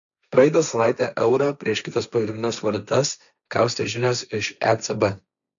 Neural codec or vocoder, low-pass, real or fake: codec, 16 kHz, 1.1 kbps, Voila-Tokenizer; 7.2 kHz; fake